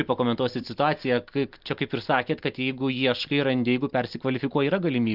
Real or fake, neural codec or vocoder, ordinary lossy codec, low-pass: real; none; Opus, 32 kbps; 5.4 kHz